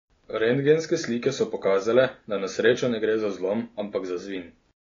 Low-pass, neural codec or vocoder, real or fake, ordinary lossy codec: 7.2 kHz; none; real; AAC, 32 kbps